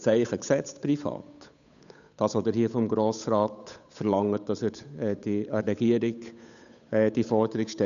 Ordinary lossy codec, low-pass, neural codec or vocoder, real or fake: none; 7.2 kHz; codec, 16 kHz, 8 kbps, FunCodec, trained on Chinese and English, 25 frames a second; fake